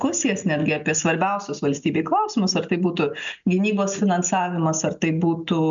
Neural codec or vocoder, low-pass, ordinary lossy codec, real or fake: none; 7.2 kHz; MP3, 64 kbps; real